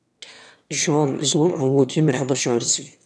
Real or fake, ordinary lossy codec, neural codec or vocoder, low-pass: fake; none; autoencoder, 22.05 kHz, a latent of 192 numbers a frame, VITS, trained on one speaker; none